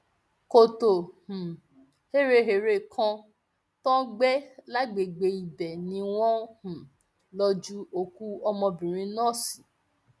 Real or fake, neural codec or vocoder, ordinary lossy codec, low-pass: real; none; none; none